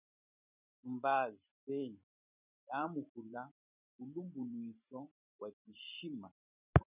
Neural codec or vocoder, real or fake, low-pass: vocoder, 44.1 kHz, 128 mel bands every 512 samples, BigVGAN v2; fake; 3.6 kHz